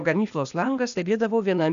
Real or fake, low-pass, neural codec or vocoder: fake; 7.2 kHz; codec, 16 kHz, 0.8 kbps, ZipCodec